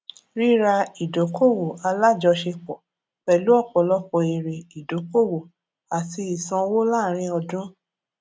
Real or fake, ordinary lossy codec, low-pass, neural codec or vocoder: real; none; none; none